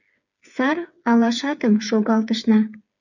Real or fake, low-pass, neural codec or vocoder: fake; 7.2 kHz; codec, 16 kHz, 8 kbps, FreqCodec, smaller model